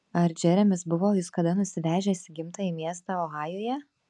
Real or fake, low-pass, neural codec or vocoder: real; 10.8 kHz; none